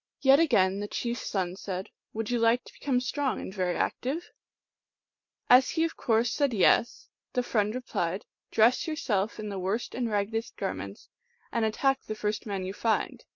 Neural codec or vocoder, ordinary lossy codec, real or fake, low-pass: none; MP3, 48 kbps; real; 7.2 kHz